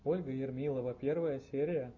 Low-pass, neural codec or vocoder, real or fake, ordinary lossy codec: 7.2 kHz; none; real; AAC, 48 kbps